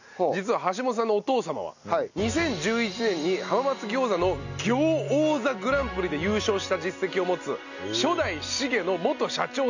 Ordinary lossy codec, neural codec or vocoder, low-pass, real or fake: none; none; 7.2 kHz; real